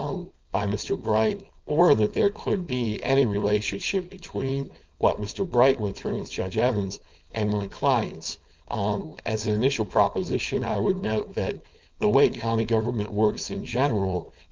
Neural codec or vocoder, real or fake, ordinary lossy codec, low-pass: codec, 16 kHz, 4.8 kbps, FACodec; fake; Opus, 24 kbps; 7.2 kHz